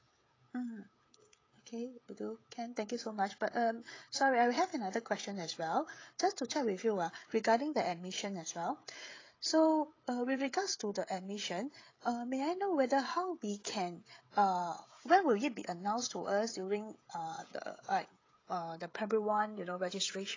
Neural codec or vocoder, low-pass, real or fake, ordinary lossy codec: codec, 16 kHz, 16 kbps, FreqCodec, smaller model; 7.2 kHz; fake; AAC, 32 kbps